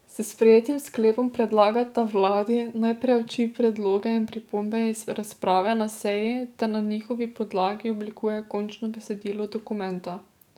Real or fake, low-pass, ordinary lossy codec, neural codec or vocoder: fake; 19.8 kHz; none; codec, 44.1 kHz, 7.8 kbps, DAC